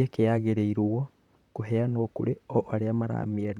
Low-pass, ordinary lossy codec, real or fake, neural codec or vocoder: 19.8 kHz; none; fake; vocoder, 44.1 kHz, 128 mel bands, Pupu-Vocoder